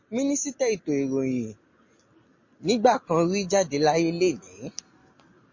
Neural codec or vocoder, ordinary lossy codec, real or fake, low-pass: none; MP3, 32 kbps; real; 7.2 kHz